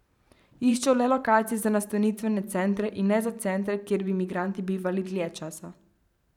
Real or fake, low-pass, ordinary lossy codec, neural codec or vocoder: fake; 19.8 kHz; none; vocoder, 44.1 kHz, 128 mel bands every 512 samples, BigVGAN v2